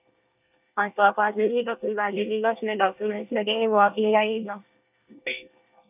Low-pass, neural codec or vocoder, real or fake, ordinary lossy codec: 3.6 kHz; codec, 24 kHz, 1 kbps, SNAC; fake; none